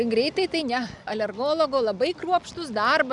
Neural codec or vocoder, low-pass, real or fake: none; 10.8 kHz; real